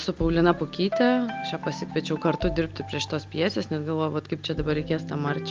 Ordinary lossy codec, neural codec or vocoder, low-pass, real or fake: Opus, 24 kbps; none; 7.2 kHz; real